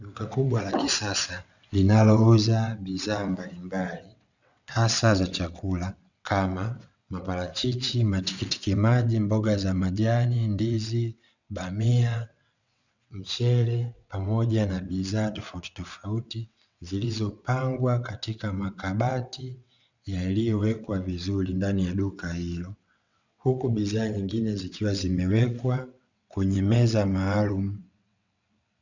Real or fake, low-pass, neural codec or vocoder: fake; 7.2 kHz; vocoder, 22.05 kHz, 80 mel bands, WaveNeXt